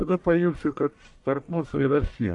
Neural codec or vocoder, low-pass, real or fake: codec, 44.1 kHz, 1.7 kbps, Pupu-Codec; 10.8 kHz; fake